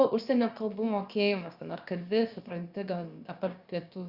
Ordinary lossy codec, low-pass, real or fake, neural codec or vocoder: Opus, 64 kbps; 5.4 kHz; fake; codec, 16 kHz, about 1 kbps, DyCAST, with the encoder's durations